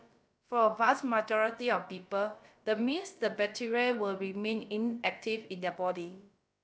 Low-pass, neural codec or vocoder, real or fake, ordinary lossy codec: none; codec, 16 kHz, about 1 kbps, DyCAST, with the encoder's durations; fake; none